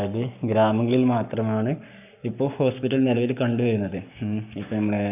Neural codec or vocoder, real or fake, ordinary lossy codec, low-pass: codec, 44.1 kHz, 7.8 kbps, Pupu-Codec; fake; none; 3.6 kHz